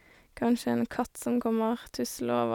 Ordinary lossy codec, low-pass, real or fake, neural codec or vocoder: none; 19.8 kHz; real; none